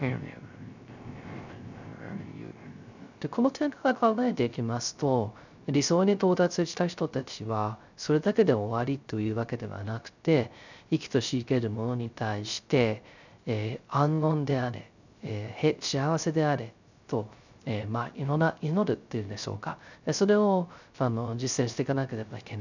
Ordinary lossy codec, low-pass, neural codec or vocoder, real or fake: none; 7.2 kHz; codec, 16 kHz, 0.3 kbps, FocalCodec; fake